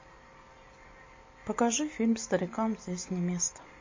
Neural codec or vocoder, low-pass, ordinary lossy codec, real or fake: none; 7.2 kHz; MP3, 32 kbps; real